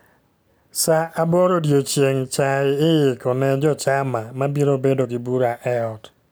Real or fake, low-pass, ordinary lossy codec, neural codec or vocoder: real; none; none; none